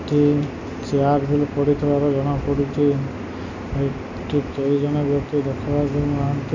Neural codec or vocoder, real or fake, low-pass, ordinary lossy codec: none; real; 7.2 kHz; none